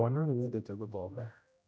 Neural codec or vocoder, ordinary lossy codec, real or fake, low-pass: codec, 16 kHz, 0.5 kbps, X-Codec, HuBERT features, trained on general audio; none; fake; none